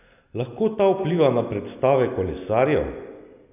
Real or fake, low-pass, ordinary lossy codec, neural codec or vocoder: real; 3.6 kHz; none; none